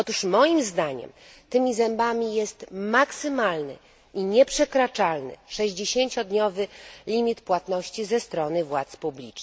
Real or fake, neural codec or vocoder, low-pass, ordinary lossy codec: real; none; none; none